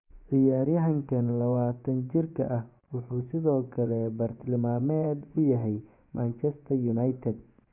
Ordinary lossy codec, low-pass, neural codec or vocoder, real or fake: Opus, 64 kbps; 3.6 kHz; vocoder, 24 kHz, 100 mel bands, Vocos; fake